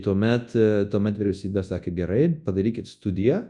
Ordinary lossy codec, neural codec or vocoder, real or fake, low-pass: Opus, 64 kbps; codec, 24 kHz, 0.9 kbps, WavTokenizer, large speech release; fake; 10.8 kHz